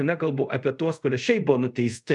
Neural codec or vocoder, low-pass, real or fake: codec, 24 kHz, 0.5 kbps, DualCodec; 10.8 kHz; fake